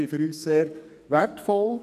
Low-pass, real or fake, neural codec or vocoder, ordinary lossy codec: 14.4 kHz; fake; codec, 44.1 kHz, 2.6 kbps, SNAC; none